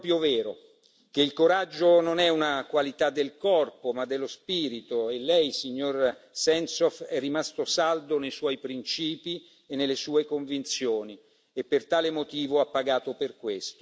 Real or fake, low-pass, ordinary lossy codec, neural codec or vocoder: real; none; none; none